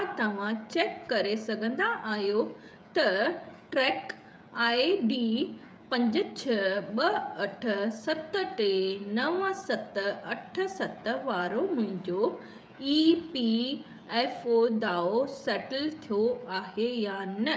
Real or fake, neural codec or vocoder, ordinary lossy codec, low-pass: fake; codec, 16 kHz, 16 kbps, FreqCodec, smaller model; none; none